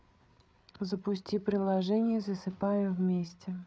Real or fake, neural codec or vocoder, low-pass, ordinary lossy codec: fake; codec, 16 kHz, 8 kbps, FreqCodec, larger model; none; none